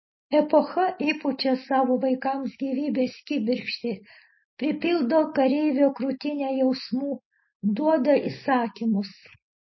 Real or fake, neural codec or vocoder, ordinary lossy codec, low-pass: real; none; MP3, 24 kbps; 7.2 kHz